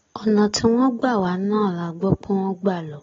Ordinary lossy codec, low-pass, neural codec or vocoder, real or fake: AAC, 24 kbps; 7.2 kHz; none; real